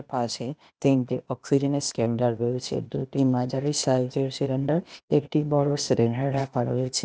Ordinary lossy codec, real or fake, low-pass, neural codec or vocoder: none; fake; none; codec, 16 kHz, 0.8 kbps, ZipCodec